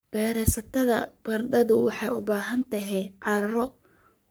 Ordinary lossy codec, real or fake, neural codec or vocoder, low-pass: none; fake; codec, 44.1 kHz, 3.4 kbps, Pupu-Codec; none